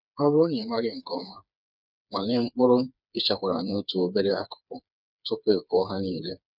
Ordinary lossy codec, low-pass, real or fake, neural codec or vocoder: none; 5.4 kHz; fake; codec, 16 kHz, 4 kbps, FreqCodec, smaller model